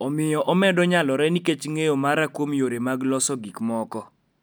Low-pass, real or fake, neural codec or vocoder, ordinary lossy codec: none; real; none; none